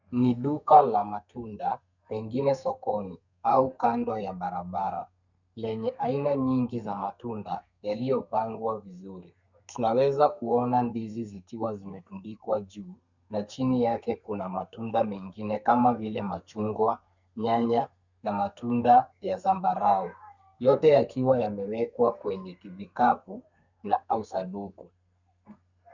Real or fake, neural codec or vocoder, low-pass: fake; codec, 32 kHz, 1.9 kbps, SNAC; 7.2 kHz